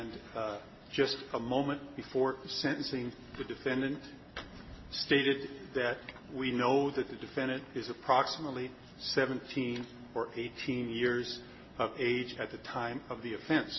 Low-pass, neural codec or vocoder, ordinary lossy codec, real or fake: 7.2 kHz; none; MP3, 24 kbps; real